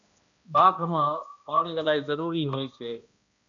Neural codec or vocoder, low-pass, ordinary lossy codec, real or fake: codec, 16 kHz, 1 kbps, X-Codec, HuBERT features, trained on balanced general audio; 7.2 kHz; AAC, 64 kbps; fake